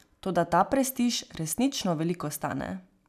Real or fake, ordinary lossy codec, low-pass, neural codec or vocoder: real; none; 14.4 kHz; none